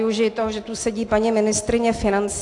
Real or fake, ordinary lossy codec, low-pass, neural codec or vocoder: real; AAC, 48 kbps; 10.8 kHz; none